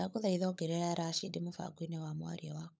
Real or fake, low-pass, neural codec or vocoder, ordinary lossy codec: fake; none; codec, 16 kHz, 16 kbps, FunCodec, trained on LibriTTS, 50 frames a second; none